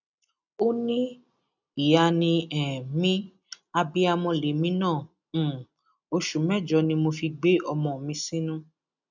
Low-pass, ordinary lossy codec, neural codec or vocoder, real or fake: 7.2 kHz; none; none; real